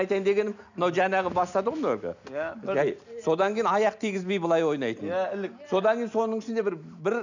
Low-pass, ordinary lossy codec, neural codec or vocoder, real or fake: 7.2 kHz; none; none; real